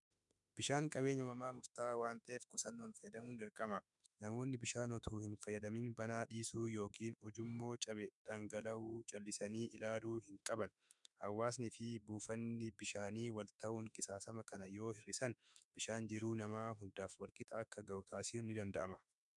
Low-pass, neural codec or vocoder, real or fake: 10.8 kHz; autoencoder, 48 kHz, 32 numbers a frame, DAC-VAE, trained on Japanese speech; fake